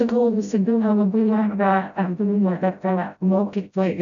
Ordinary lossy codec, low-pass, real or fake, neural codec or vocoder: MP3, 96 kbps; 7.2 kHz; fake; codec, 16 kHz, 0.5 kbps, FreqCodec, smaller model